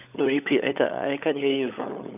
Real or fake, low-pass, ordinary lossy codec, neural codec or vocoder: fake; 3.6 kHz; none; codec, 16 kHz, 16 kbps, FunCodec, trained on LibriTTS, 50 frames a second